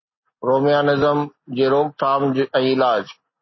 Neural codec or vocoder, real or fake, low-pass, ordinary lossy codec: none; real; 7.2 kHz; MP3, 24 kbps